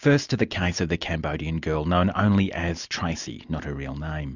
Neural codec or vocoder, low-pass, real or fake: none; 7.2 kHz; real